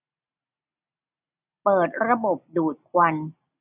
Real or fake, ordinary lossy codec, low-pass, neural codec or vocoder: real; none; 3.6 kHz; none